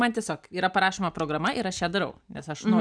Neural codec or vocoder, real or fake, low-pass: none; real; 9.9 kHz